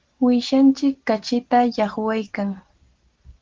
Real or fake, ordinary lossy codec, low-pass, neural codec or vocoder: real; Opus, 16 kbps; 7.2 kHz; none